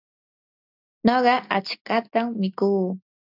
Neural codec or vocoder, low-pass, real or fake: none; 5.4 kHz; real